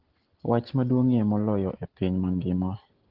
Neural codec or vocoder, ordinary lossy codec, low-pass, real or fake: none; Opus, 16 kbps; 5.4 kHz; real